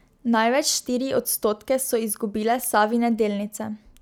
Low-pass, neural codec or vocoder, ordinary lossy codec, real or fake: none; none; none; real